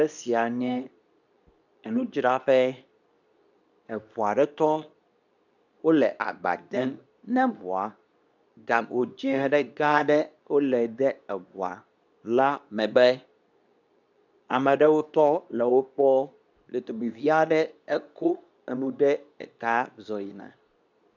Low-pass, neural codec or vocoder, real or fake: 7.2 kHz; codec, 24 kHz, 0.9 kbps, WavTokenizer, medium speech release version 2; fake